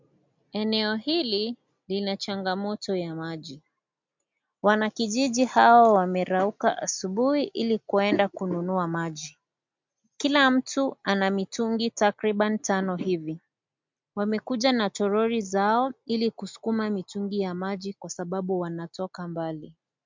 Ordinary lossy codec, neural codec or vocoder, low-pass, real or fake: MP3, 64 kbps; none; 7.2 kHz; real